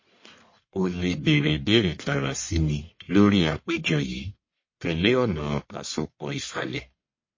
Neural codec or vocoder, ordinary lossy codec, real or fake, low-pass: codec, 44.1 kHz, 1.7 kbps, Pupu-Codec; MP3, 32 kbps; fake; 7.2 kHz